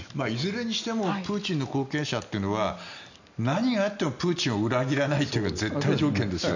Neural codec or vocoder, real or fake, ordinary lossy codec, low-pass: none; real; none; 7.2 kHz